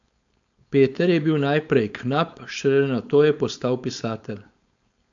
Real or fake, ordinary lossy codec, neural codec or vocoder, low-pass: fake; AAC, 64 kbps; codec, 16 kHz, 4.8 kbps, FACodec; 7.2 kHz